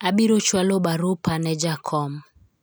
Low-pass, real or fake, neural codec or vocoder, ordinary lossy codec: none; real; none; none